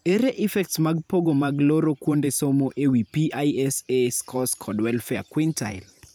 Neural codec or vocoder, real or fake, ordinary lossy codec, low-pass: vocoder, 44.1 kHz, 128 mel bands every 512 samples, BigVGAN v2; fake; none; none